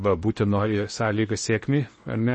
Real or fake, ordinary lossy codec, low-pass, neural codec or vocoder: fake; MP3, 32 kbps; 10.8 kHz; codec, 16 kHz in and 24 kHz out, 0.8 kbps, FocalCodec, streaming, 65536 codes